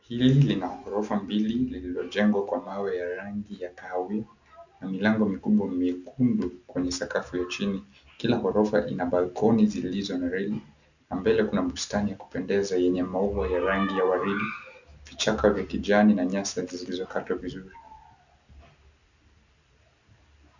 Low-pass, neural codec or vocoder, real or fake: 7.2 kHz; none; real